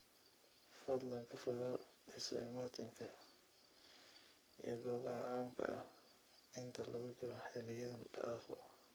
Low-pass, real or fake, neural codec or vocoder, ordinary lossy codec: none; fake; codec, 44.1 kHz, 3.4 kbps, Pupu-Codec; none